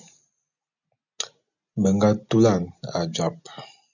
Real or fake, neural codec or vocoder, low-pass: real; none; 7.2 kHz